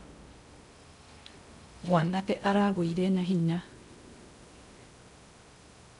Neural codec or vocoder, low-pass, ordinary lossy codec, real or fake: codec, 16 kHz in and 24 kHz out, 0.6 kbps, FocalCodec, streaming, 2048 codes; 10.8 kHz; MP3, 96 kbps; fake